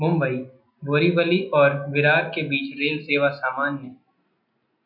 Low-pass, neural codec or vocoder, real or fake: 5.4 kHz; none; real